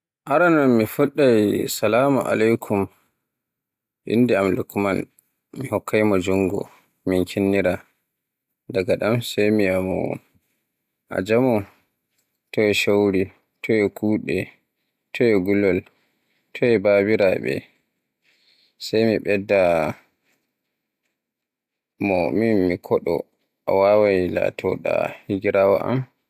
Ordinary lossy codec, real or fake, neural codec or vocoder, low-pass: AAC, 96 kbps; real; none; 14.4 kHz